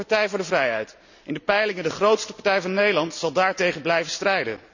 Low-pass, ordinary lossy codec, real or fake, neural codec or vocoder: 7.2 kHz; none; real; none